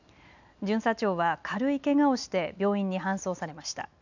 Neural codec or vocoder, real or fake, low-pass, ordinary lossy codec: none; real; 7.2 kHz; none